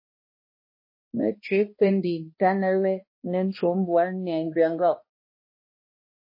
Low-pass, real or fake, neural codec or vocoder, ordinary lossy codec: 5.4 kHz; fake; codec, 16 kHz, 1 kbps, X-Codec, HuBERT features, trained on balanced general audio; MP3, 24 kbps